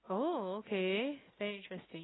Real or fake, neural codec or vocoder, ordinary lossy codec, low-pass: real; none; AAC, 16 kbps; 7.2 kHz